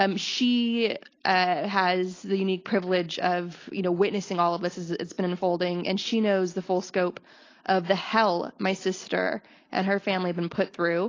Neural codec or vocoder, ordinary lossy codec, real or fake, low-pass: none; AAC, 32 kbps; real; 7.2 kHz